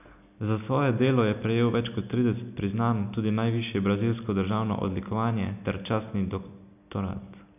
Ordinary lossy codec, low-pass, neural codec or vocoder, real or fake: none; 3.6 kHz; none; real